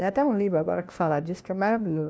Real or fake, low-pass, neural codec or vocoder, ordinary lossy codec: fake; none; codec, 16 kHz, 1 kbps, FunCodec, trained on LibriTTS, 50 frames a second; none